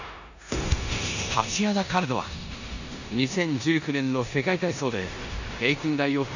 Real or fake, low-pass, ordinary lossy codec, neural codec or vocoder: fake; 7.2 kHz; none; codec, 16 kHz in and 24 kHz out, 0.9 kbps, LongCat-Audio-Codec, four codebook decoder